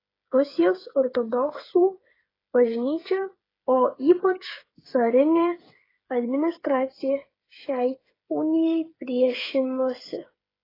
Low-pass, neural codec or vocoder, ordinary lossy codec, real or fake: 5.4 kHz; codec, 16 kHz, 8 kbps, FreqCodec, smaller model; AAC, 24 kbps; fake